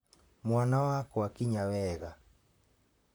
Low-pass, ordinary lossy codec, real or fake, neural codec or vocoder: none; none; fake; vocoder, 44.1 kHz, 128 mel bands, Pupu-Vocoder